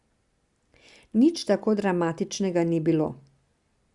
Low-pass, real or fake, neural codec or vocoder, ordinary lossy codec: 10.8 kHz; real; none; Opus, 64 kbps